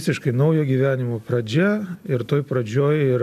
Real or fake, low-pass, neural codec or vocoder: real; 14.4 kHz; none